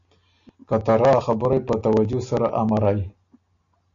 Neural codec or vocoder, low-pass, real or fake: none; 7.2 kHz; real